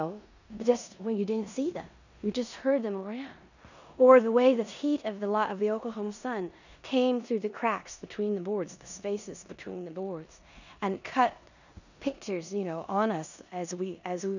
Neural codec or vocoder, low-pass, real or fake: codec, 16 kHz in and 24 kHz out, 0.9 kbps, LongCat-Audio-Codec, four codebook decoder; 7.2 kHz; fake